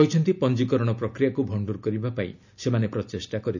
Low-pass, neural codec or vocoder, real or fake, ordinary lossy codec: 7.2 kHz; none; real; none